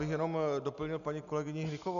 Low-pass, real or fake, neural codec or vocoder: 7.2 kHz; real; none